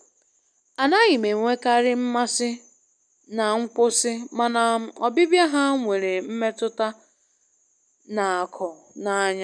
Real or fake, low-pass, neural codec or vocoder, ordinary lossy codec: real; 9.9 kHz; none; none